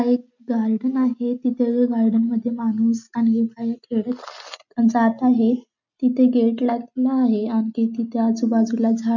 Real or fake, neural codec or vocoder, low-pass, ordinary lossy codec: real; none; 7.2 kHz; none